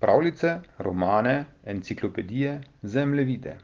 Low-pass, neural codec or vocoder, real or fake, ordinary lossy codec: 7.2 kHz; none; real; Opus, 16 kbps